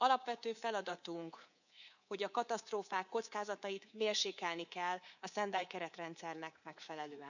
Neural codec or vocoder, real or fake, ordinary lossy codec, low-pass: codec, 24 kHz, 3.1 kbps, DualCodec; fake; none; 7.2 kHz